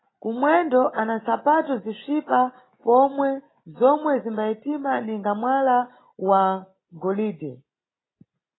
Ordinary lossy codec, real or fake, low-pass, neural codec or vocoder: AAC, 16 kbps; real; 7.2 kHz; none